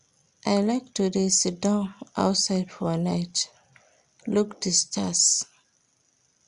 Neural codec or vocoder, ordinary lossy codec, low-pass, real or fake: none; Opus, 64 kbps; 10.8 kHz; real